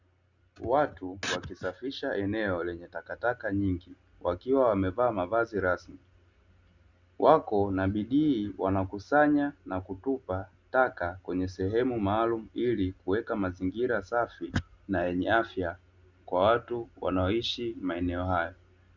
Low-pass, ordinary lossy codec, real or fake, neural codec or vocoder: 7.2 kHz; Opus, 64 kbps; real; none